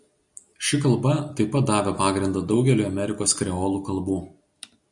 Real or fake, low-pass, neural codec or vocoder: real; 10.8 kHz; none